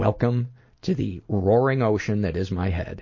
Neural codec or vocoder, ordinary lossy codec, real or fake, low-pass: none; MP3, 32 kbps; real; 7.2 kHz